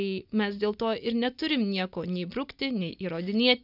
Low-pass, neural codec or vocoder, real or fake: 5.4 kHz; none; real